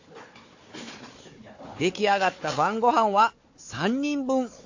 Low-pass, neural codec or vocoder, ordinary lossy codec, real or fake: 7.2 kHz; codec, 16 kHz, 4 kbps, FunCodec, trained on Chinese and English, 50 frames a second; AAC, 48 kbps; fake